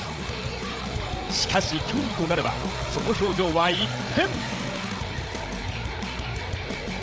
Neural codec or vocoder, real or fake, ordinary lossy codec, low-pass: codec, 16 kHz, 8 kbps, FreqCodec, larger model; fake; none; none